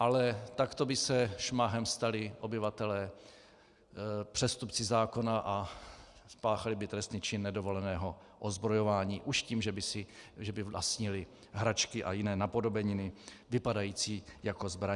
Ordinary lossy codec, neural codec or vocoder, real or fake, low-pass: Opus, 64 kbps; none; real; 10.8 kHz